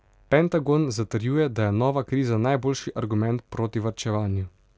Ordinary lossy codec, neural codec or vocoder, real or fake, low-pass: none; none; real; none